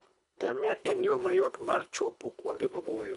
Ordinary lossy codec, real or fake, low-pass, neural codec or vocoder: none; fake; 10.8 kHz; codec, 24 kHz, 1.5 kbps, HILCodec